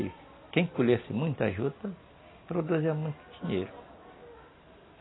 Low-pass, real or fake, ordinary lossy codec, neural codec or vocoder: 7.2 kHz; real; AAC, 16 kbps; none